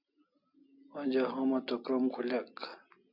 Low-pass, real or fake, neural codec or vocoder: 5.4 kHz; real; none